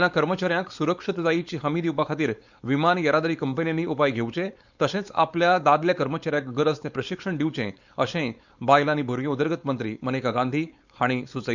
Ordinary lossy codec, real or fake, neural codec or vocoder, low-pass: Opus, 64 kbps; fake; codec, 16 kHz, 4.8 kbps, FACodec; 7.2 kHz